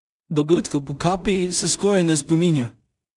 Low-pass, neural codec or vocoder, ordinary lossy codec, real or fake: 10.8 kHz; codec, 16 kHz in and 24 kHz out, 0.4 kbps, LongCat-Audio-Codec, two codebook decoder; none; fake